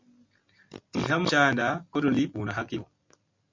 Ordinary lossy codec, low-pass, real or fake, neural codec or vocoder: MP3, 64 kbps; 7.2 kHz; real; none